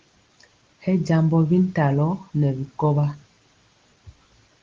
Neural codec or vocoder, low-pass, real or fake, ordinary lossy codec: none; 7.2 kHz; real; Opus, 16 kbps